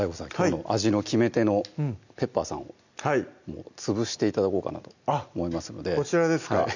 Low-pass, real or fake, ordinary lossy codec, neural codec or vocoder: 7.2 kHz; real; none; none